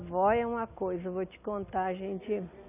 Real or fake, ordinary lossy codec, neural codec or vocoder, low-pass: real; none; none; 3.6 kHz